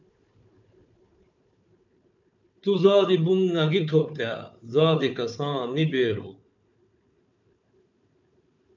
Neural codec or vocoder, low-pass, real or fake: codec, 16 kHz, 4 kbps, FunCodec, trained on Chinese and English, 50 frames a second; 7.2 kHz; fake